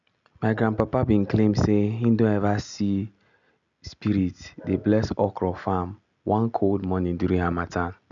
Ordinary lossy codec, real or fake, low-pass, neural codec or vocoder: none; real; 7.2 kHz; none